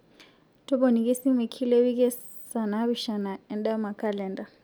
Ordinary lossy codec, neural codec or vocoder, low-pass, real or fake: none; none; none; real